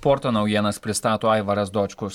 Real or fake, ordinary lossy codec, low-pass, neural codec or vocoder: real; MP3, 96 kbps; 19.8 kHz; none